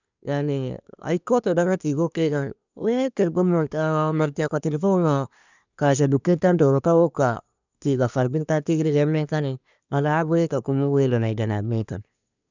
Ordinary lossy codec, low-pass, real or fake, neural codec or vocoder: none; 7.2 kHz; fake; codec, 24 kHz, 1 kbps, SNAC